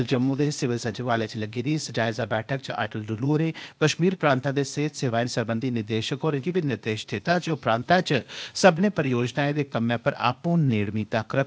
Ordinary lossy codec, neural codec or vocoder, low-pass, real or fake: none; codec, 16 kHz, 0.8 kbps, ZipCodec; none; fake